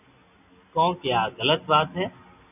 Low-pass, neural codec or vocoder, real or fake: 3.6 kHz; none; real